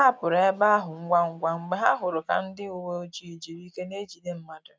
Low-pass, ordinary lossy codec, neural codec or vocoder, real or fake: none; none; none; real